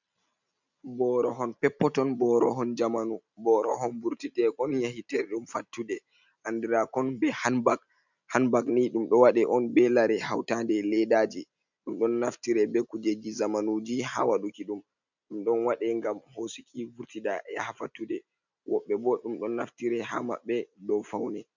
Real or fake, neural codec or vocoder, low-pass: real; none; 7.2 kHz